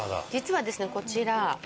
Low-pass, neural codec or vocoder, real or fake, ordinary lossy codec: none; none; real; none